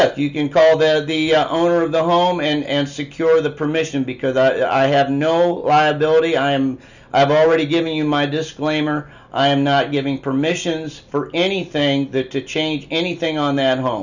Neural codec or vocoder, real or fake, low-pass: none; real; 7.2 kHz